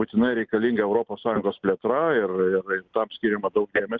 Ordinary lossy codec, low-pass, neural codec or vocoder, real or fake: Opus, 24 kbps; 7.2 kHz; none; real